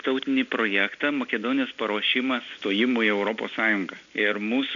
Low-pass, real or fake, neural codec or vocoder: 7.2 kHz; real; none